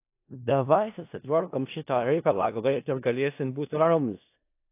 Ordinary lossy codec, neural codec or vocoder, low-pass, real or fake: AAC, 24 kbps; codec, 16 kHz in and 24 kHz out, 0.4 kbps, LongCat-Audio-Codec, four codebook decoder; 3.6 kHz; fake